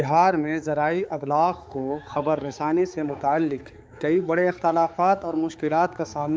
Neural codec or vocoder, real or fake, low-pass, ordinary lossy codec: codec, 16 kHz, 4 kbps, X-Codec, HuBERT features, trained on balanced general audio; fake; none; none